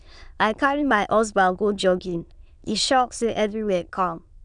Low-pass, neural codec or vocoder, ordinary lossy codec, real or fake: 9.9 kHz; autoencoder, 22.05 kHz, a latent of 192 numbers a frame, VITS, trained on many speakers; none; fake